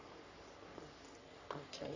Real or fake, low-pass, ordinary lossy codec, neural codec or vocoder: fake; 7.2 kHz; AAC, 48 kbps; codec, 44.1 kHz, 3.4 kbps, Pupu-Codec